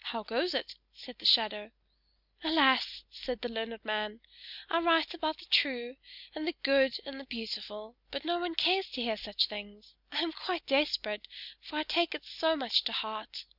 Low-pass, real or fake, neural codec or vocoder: 5.4 kHz; real; none